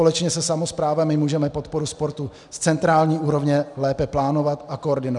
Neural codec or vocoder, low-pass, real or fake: none; 10.8 kHz; real